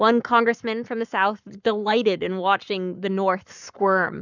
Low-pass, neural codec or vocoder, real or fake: 7.2 kHz; codec, 44.1 kHz, 7.8 kbps, Pupu-Codec; fake